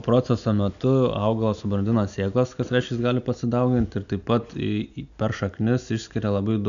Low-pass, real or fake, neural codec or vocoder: 7.2 kHz; real; none